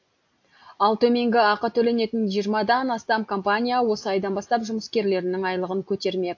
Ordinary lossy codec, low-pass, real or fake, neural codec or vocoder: AAC, 48 kbps; 7.2 kHz; real; none